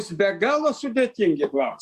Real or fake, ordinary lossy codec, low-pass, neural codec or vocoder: real; Opus, 64 kbps; 14.4 kHz; none